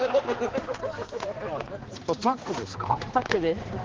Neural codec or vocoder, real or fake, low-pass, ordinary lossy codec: codec, 16 kHz, 2 kbps, X-Codec, HuBERT features, trained on balanced general audio; fake; 7.2 kHz; Opus, 16 kbps